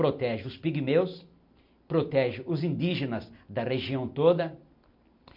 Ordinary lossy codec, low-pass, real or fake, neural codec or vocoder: MP3, 48 kbps; 5.4 kHz; real; none